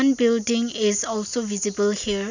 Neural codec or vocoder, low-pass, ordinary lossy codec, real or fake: none; 7.2 kHz; none; real